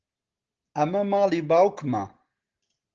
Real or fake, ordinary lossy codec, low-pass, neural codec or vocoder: real; Opus, 16 kbps; 7.2 kHz; none